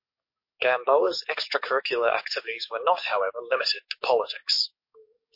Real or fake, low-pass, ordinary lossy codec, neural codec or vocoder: fake; 5.4 kHz; MP3, 32 kbps; codec, 44.1 kHz, 7.8 kbps, DAC